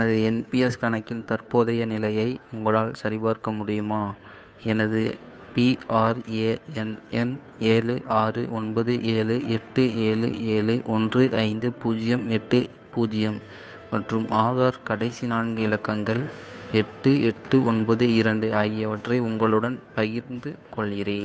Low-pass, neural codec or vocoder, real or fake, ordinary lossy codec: none; codec, 16 kHz, 2 kbps, FunCodec, trained on Chinese and English, 25 frames a second; fake; none